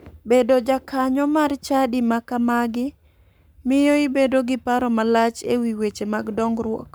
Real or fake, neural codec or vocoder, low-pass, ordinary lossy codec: fake; codec, 44.1 kHz, 7.8 kbps, Pupu-Codec; none; none